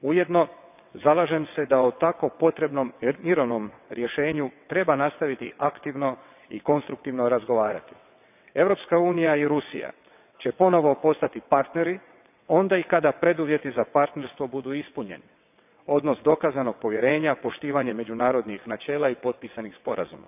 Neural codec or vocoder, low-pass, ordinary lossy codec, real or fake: vocoder, 22.05 kHz, 80 mel bands, WaveNeXt; 3.6 kHz; none; fake